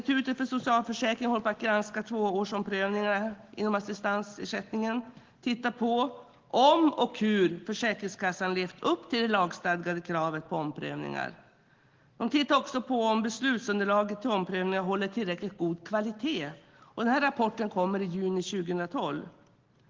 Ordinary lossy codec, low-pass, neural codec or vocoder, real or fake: Opus, 16 kbps; 7.2 kHz; none; real